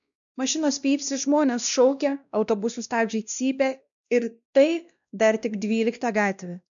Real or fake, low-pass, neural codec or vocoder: fake; 7.2 kHz; codec, 16 kHz, 1 kbps, X-Codec, WavLM features, trained on Multilingual LibriSpeech